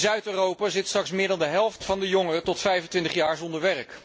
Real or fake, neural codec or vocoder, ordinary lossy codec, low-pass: real; none; none; none